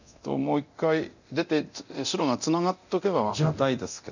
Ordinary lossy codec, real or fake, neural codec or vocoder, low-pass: none; fake; codec, 24 kHz, 0.9 kbps, DualCodec; 7.2 kHz